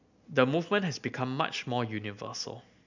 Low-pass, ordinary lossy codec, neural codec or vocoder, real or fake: 7.2 kHz; none; none; real